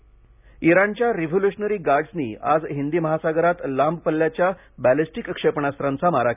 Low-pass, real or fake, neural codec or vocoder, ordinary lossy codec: 3.6 kHz; real; none; none